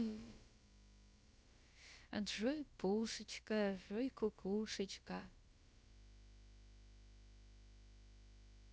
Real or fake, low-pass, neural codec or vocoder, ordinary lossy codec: fake; none; codec, 16 kHz, about 1 kbps, DyCAST, with the encoder's durations; none